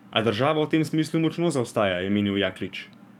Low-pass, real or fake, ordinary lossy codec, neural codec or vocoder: 19.8 kHz; fake; none; codec, 44.1 kHz, 7.8 kbps, DAC